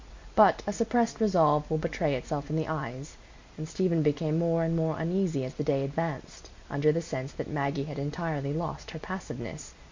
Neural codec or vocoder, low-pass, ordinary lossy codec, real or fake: none; 7.2 kHz; MP3, 48 kbps; real